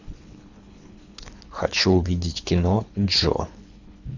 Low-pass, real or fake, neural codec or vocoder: 7.2 kHz; fake; codec, 24 kHz, 3 kbps, HILCodec